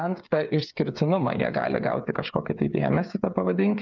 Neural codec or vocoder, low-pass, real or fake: codec, 16 kHz, 8 kbps, FreqCodec, smaller model; 7.2 kHz; fake